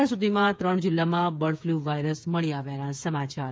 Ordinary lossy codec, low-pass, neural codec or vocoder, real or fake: none; none; codec, 16 kHz, 8 kbps, FreqCodec, smaller model; fake